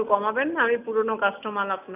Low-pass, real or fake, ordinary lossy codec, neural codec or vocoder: 3.6 kHz; real; none; none